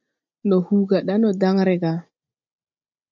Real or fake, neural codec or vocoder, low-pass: real; none; 7.2 kHz